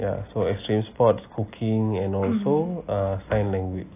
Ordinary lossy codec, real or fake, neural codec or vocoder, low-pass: AAC, 24 kbps; real; none; 3.6 kHz